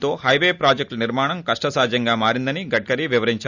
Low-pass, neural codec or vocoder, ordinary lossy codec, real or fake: 7.2 kHz; none; none; real